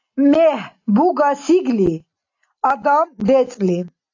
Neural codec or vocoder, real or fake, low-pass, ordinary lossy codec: none; real; 7.2 kHz; AAC, 48 kbps